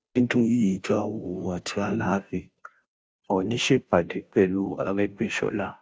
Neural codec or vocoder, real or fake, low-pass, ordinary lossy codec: codec, 16 kHz, 0.5 kbps, FunCodec, trained on Chinese and English, 25 frames a second; fake; none; none